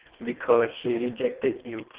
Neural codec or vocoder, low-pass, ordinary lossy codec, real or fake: codec, 24 kHz, 1.5 kbps, HILCodec; 3.6 kHz; Opus, 16 kbps; fake